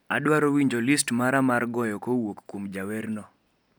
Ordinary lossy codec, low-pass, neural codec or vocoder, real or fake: none; none; none; real